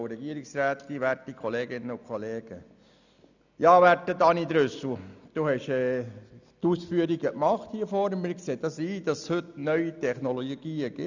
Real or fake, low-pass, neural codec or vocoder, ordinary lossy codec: real; 7.2 kHz; none; none